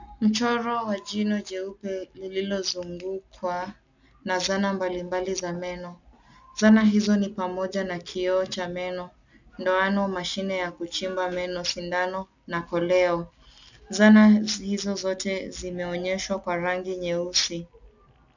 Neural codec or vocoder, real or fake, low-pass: none; real; 7.2 kHz